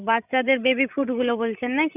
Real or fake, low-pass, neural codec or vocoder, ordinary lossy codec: fake; 3.6 kHz; codec, 16 kHz, 16 kbps, FreqCodec, larger model; none